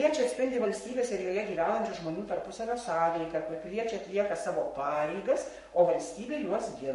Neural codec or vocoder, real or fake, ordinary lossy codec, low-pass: codec, 44.1 kHz, 7.8 kbps, Pupu-Codec; fake; MP3, 48 kbps; 14.4 kHz